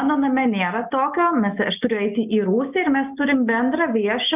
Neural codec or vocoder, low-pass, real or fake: none; 3.6 kHz; real